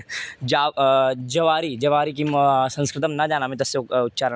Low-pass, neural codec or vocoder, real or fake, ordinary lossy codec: none; none; real; none